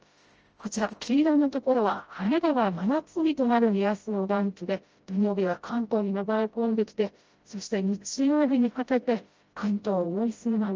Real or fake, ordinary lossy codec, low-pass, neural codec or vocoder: fake; Opus, 24 kbps; 7.2 kHz; codec, 16 kHz, 0.5 kbps, FreqCodec, smaller model